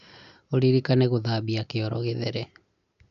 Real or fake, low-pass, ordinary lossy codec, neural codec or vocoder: real; 7.2 kHz; none; none